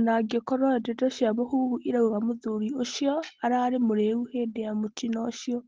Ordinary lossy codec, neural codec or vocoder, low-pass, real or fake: Opus, 16 kbps; none; 7.2 kHz; real